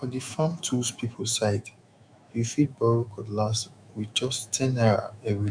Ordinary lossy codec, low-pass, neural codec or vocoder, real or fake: none; 9.9 kHz; autoencoder, 48 kHz, 128 numbers a frame, DAC-VAE, trained on Japanese speech; fake